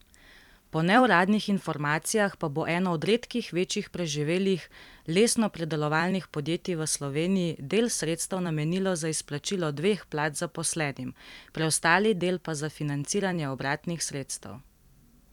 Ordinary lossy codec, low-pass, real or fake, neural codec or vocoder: none; 19.8 kHz; fake; vocoder, 44.1 kHz, 128 mel bands every 256 samples, BigVGAN v2